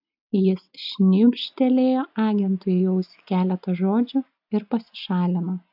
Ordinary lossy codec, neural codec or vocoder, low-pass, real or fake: AAC, 48 kbps; none; 5.4 kHz; real